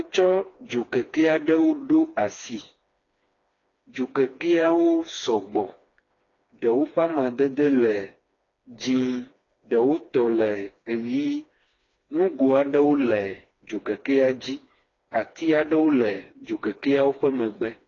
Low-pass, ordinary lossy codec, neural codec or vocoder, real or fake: 7.2 kHz; AAC, 32 kbps; codec, 16 kHz, 2 kbps, FreqCodec, smaller model; fake